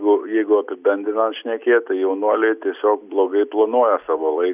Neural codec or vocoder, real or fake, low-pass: none; real; 3.6 kHz